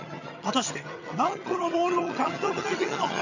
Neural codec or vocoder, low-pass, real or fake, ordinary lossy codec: vocoder, 22.05 kHz, 80 mel bands, HiFi-GAN; 7.2 kHz; fake; none